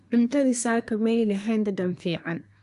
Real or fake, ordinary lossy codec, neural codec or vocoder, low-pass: fake; none; codec, 24 kHz, 1 kbps, SNAC; 10.8 kHz